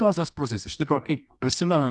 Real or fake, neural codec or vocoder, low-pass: fake; codec, 24 kHz, 0.9 kbps, WavTokenizer, medium music audio release; 10.8 kHz